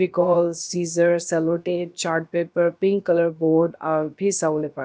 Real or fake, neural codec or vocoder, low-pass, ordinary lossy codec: fake; codec, 16 kHz, about 1 kbps, DyCAST, with the encoder's durations; none; none